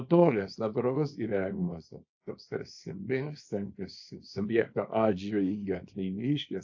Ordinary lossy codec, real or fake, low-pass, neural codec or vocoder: AAC, 48 kbps; fake; 7.2 kHz; codec, 24 kHz, 0.9 kbps, WavTokenizer, small release